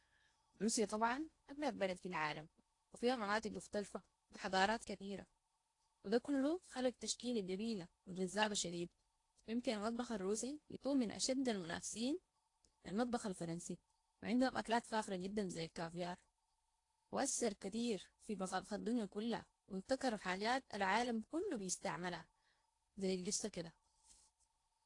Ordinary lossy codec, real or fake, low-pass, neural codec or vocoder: AAC, 48 kbps; fake; 10.8 kHz; codec, 16 kHz in and 24 kHz out, 0.8 kbps, FocalCodec, streaming, 65536 codes